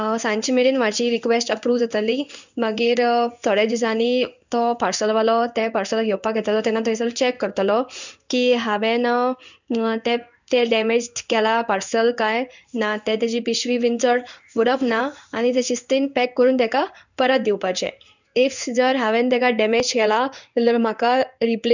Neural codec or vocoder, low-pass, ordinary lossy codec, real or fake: codec, 16 kHz in and 24 kHz out, 1 kbps, XY-Tokenizer; 7.2 kHz; none; fake